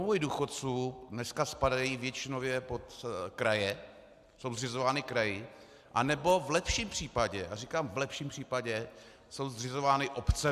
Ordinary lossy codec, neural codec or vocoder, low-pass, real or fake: AAC, 96 kbps; none; 14.4 kHz; real